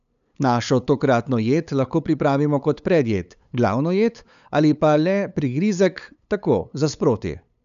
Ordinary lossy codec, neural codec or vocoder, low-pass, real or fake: none; codec, 16 kHz, 8 kbps, FunCodec, trained on LibriTTS, 25 frames a second; 7.2 kHz; fake